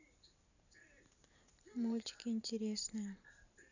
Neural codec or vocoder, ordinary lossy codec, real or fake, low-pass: vocoder, 44.1 kHz, 128 mel bands every 512 samples, BigVGAN v2; none; fake; 7.2 kHz